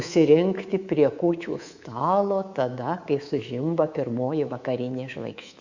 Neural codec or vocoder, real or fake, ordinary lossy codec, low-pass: codec, 24 kHz, 3.1 kbps, DualCodec; fake; Opus, 64 kbps; 7.2 kHz